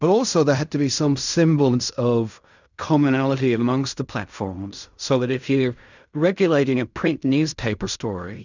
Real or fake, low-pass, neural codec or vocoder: fake; 7.2 kHz; codec, 16 kHz in and 24 kHz out, 0.4 kbps, LongCat-Audio-Codec, fine tuned four codebook decoder